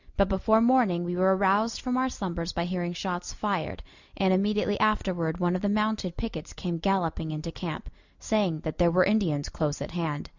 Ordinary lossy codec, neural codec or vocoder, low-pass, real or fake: Opus, 64 kbps; none; 7.2 kHz; real